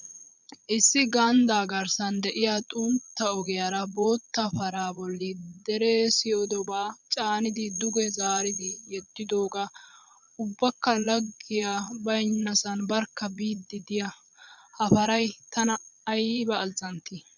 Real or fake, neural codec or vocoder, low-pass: fake; vocoder, 22.05 kHz, 80 mel bands, Vocos; 7.2 kHz